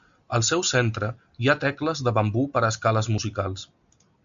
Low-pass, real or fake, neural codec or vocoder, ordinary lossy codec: 7.2 kHz; real; none; AAC, 96 kbps